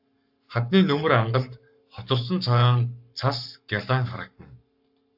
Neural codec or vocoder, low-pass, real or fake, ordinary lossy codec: codec, 44.1 kHz, 7.8 kbps, Pupu-Codec; 5.4 kHz; fake; AAC, 48 kbps